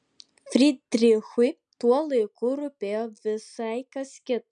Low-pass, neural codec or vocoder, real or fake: 9.9 kHz; none; real